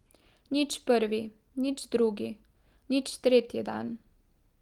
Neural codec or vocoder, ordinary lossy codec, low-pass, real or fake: none; Opus, 24 kbps; 19.8 kHz; real